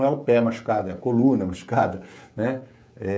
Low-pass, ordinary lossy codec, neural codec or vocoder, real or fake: none; none; codec, 16 kHz, 16 kbps, FreqCodec, smaller model; fake